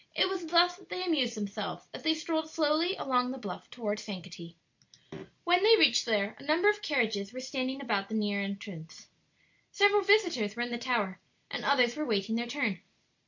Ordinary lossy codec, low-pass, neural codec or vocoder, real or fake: MP3, 48 kbps; 7.2 kHz; none; real